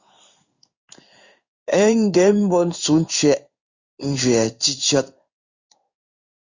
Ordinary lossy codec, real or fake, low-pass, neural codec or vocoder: Opus, 64 kbps; fake; 7.2 kHz; codec, 16 kHz in and 24 kHz out, 1 kbps, XY-Tokenizer